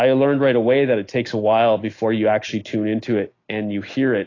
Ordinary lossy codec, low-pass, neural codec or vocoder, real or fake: AAC, 32 kbps; 7.2 kHz; none; real